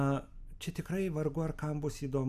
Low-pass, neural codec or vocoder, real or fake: 14.4 kHz; none; real